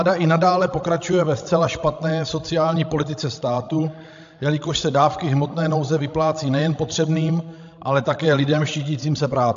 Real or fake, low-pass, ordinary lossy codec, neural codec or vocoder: fake; 7.2 kHz; AAC, 64 kbps; codec, 16 kHz, 16 kbps, FreqCodec, larger model